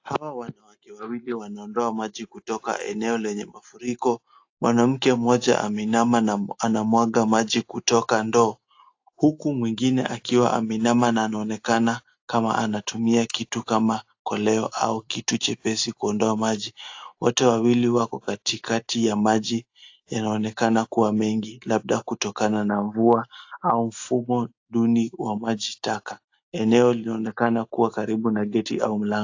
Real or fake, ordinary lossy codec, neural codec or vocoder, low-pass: real; AAC, 48 kbps; none; 7.2 kHz